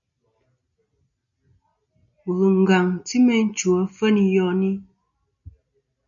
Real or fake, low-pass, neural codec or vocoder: real; 7.2 kHz; none